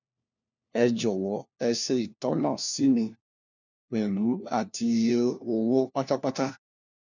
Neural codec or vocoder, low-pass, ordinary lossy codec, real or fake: codec, 16 kHz, 1 kbps, FunCodec, trained on LibriTTS, 50 frames a second; 7.2 kHz; none; fake